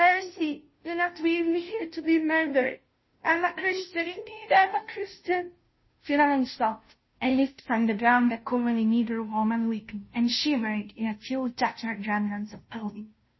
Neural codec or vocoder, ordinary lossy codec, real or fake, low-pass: codec, 16 kHz, 0.5 kbps, FunCodec, trained on Chinese and English, 25 frames a second; MP3, 24 kbps; fake; 7.2 kHz